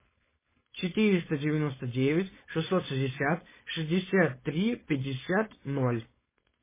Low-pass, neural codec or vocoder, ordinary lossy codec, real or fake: 3.6 kHz; codec, 16 kHz, 4.8 kbps, FACodec; MP3, 16 kbps; fake